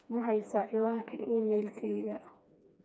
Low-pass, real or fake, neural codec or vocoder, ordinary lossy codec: none; fake; codec, 16 kHz, 2 kbps, FreqCodec, smaller model; none